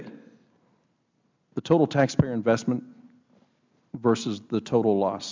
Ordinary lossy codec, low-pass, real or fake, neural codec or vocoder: MP3, 64 kbps; 7.2 kHz; real; none